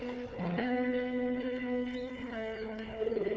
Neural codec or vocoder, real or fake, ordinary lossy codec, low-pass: codec, 16 kHz, 4 kbps, FunCodec, trained on Chinese and English, 50 frames a second; fake; none; none